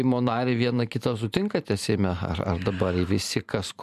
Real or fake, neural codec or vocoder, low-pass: fake; vocoder, 44.1 kHz, 128 mel bands every 512 samples, BigVGAN v2; 14.4 kHz